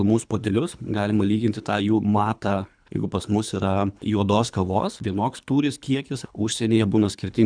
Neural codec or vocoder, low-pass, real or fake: codec, 24 kHz, 3 kbps, HILCodec; 9.9 kHz; fake